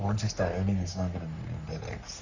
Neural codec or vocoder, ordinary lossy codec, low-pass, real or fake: codec, 44.1 kHz, 3.4 kbps, Pupu-Codec; Opus, 64 kbps; 7.2 kHz; fake